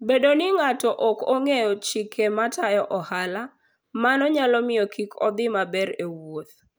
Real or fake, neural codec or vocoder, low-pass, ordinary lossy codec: real; none; none; none